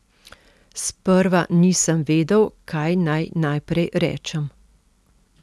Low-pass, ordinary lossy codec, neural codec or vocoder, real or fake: none; none; none; real